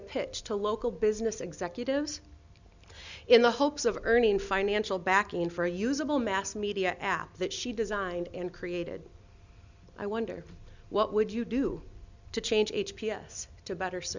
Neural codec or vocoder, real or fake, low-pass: none; real; 7.2 kHz